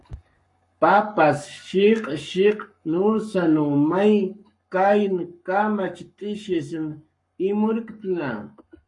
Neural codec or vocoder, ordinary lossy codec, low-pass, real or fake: codec, 44.1 kHz, 7.8 kbps, Pupu-Codec; MP3, 48 kbps; 10.8 kHz; fake